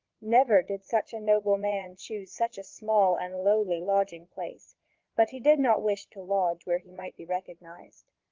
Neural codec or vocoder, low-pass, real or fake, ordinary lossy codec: vocoder, 22.05 kHz, 80 mel bands, Vocos; 7.2 kHz; fake; Opus, 16 kbps